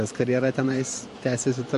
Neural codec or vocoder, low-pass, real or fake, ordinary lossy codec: vocoder, 44.1 kHz, 128 mel bands every 512 samples, BigVGAN v2; 14.4 kHz; fake; MP3, 48 kbps